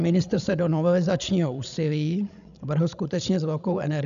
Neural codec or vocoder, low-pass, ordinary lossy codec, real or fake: codec, 16 kHz, 16 kbps, FreqCodec, larger model; 7.2 kHz; MP3, 96 kbps; fake